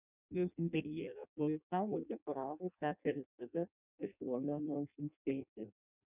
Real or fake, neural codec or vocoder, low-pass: fake; codec, 16 kHz in and 24 kHz out, 0.6 kbps, FireRedTTS-2 codec; 3.6 kHz